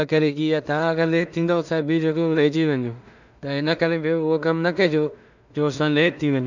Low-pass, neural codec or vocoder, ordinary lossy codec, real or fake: 7.2 kHz; codec, 16 kHz in and 24 kHz out, 0.4 kbps, LongCat-Audio-Codec, two codebook decoder; none; fake